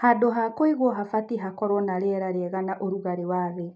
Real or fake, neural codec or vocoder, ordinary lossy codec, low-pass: real; none; none; none